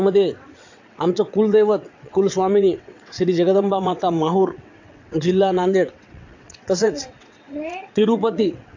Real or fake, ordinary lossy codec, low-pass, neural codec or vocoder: fake; AAC, 48 kbps; 7.2 kHz; vocoder, 22.05 kHz, 80 mel bands, WaveNeXt